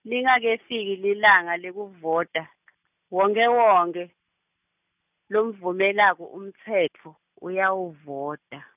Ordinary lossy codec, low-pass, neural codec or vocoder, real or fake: none; 3.6 kHz; none; real